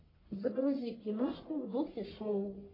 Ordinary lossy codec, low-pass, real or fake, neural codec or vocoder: AAC, 24 kbps; 5.4 kHz; fake; codec, 44.1 kHz, 1.7 kbps, Pupu-Codec